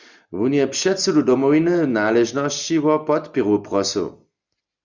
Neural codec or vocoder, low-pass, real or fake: none; 7.2 kHz; real